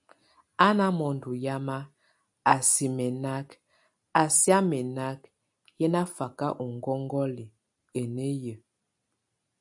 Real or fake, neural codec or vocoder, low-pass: real; none; 10.8 kHz